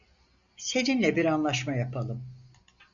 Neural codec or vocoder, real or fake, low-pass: none; real; 7.2 kHz